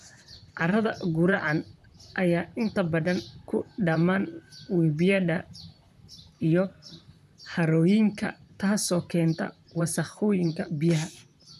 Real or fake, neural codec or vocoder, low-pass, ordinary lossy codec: fake; vocoder, 44.1 kHz, 128 mel bands, Pupu-Vocoder; 14.4 kHz; none